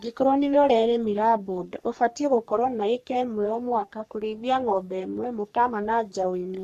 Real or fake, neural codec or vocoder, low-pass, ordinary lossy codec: fake; codec, 44.1 kHz, 3.4 kbps, Pupu-Codec; 14.4 kHz; none